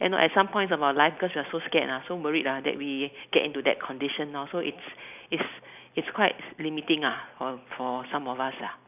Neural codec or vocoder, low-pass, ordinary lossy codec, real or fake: none; 3.6 kHz; none; real